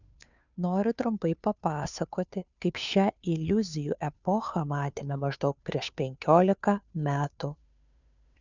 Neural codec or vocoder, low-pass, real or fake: codec, 16 kHz, 2 kbps, FunCodec, trained on Chinese and English, 25 frames a second; 7.2 kHz; fake